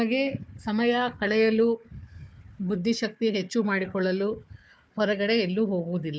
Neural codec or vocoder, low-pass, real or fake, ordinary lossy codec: codec, 16 kHz, 4 kbps, FunCodec, trained on Chinese and English, 50 frames a second; none; fake; none